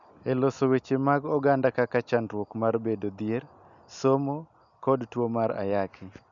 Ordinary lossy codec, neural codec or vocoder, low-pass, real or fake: none; none; 7.2 kHz; real